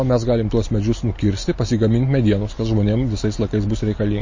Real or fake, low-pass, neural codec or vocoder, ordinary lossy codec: real; 7.2 kHz; none; MP3, 32 kbps